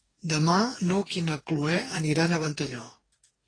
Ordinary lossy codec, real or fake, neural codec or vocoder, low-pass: AAC, 32 kbps; fake; codec, 44.1 kHz, 2.6 kbps, DAC; 9.9 kHz